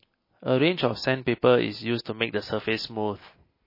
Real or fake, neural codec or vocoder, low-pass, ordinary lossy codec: real; none; 5.4 kHz; MP3, 24 kbps